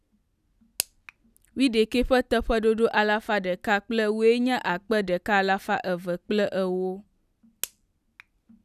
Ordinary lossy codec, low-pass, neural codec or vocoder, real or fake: none; 14.4 kHz; none; real